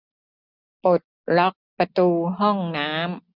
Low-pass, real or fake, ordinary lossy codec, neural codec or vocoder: 5.4 kHz; real; none; none